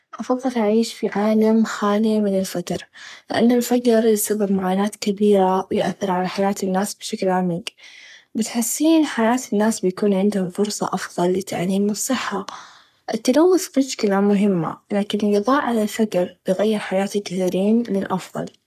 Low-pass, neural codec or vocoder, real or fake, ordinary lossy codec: 14.4 kHz; codec, 44.1 kHz, 3.4 kbps, Pupu-Codec; fake; none